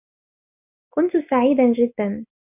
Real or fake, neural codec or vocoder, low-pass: real; none; 3.6 kHz